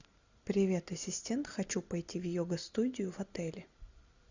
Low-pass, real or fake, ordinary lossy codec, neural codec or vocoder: 7.2 kHz; real; Opus, 64 kbps; none